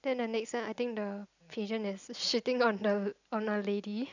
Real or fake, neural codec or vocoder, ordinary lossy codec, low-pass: real; none; none; 7.2 kHz